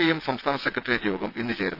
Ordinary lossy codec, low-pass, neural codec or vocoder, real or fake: none; 5.4 kHz; vocoder, 22.05 kHz, 80 mel bands, WaveNeXt; fake